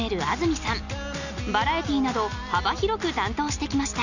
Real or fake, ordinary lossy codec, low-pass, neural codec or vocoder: real; none; 7.2 kHz; none